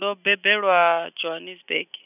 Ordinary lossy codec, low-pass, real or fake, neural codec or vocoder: none; 3.6 kHz; real; none